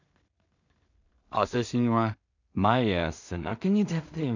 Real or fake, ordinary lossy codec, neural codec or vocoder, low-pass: fake; none; codec, 16 kHz in and 24 kHz out, 0.4 kbps, LongCat-Audio-Codec, two codebook decoder; 7.2 kHz